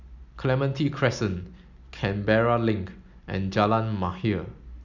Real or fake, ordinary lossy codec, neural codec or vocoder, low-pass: real; none; none; 7.2 kHz